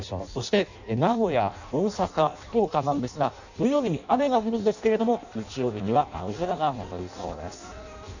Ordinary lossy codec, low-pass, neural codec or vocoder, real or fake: none; 7.2 kHz; codec, 16 kHz in and 24 kHz out, 0.6 kbps, FireRedTTS-2 codec; fake